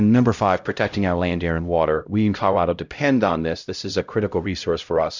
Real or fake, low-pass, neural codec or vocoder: fake; 7.2 kHz; codec, 16 kHz, 0.5 kbps, X-Codec, HuBERT features, trained on LibriSpeech